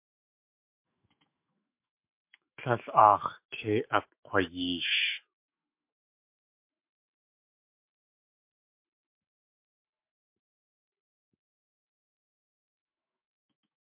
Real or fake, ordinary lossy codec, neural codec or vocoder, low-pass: fake; MP3, 32 kbps; autoencoder, 48 kHz, 128 numbers a frame, DAC-VAE, trained on Japanese speech; 3.6 kHz